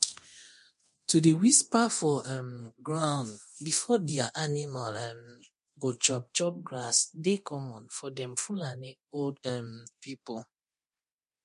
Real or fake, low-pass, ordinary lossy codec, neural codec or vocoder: fake; 10.8 kHz; MP3, 48 kbps; codec, 24 kHz, 0.9 kbps, DualCodec